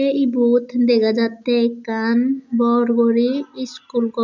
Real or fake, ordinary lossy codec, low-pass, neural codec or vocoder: real; none; 7.2 kHz; none